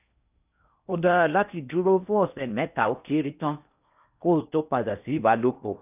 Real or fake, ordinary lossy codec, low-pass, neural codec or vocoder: fake; none; 3.6 kHz; codec, 16 kHz in and 24 kHz out, 0.8 kbps, FocalCodec, streaming, 65536 codes